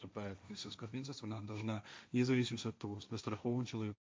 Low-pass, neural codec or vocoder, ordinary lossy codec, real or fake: none; codec, 16 kHz, 1.1 kbps, Voila-Tokenizer; none; fake